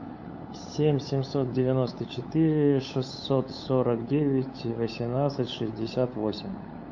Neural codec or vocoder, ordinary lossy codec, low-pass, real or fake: codec, 16 kHz, 8 kbps, FunCodec, trained on LibriTTS, 25 frames a second; MP3, 48 kbps; 7.2 kHz; fake